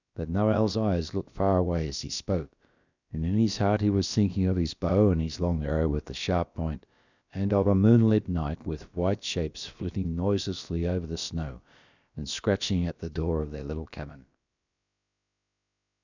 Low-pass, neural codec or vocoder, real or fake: 7.2 kHz; codec, 16 kHz, about 1 kbps, DyCAST, with the encoder's durations; fake